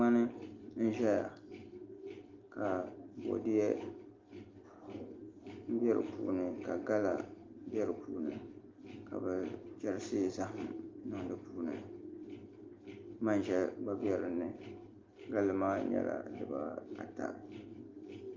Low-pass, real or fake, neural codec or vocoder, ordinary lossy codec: 7.2 kHz; real; none; Opus, 32 kbps